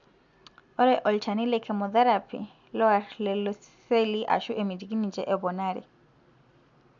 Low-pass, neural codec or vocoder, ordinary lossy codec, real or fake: 7.2 kHz; none; MP3, 64 kbps; real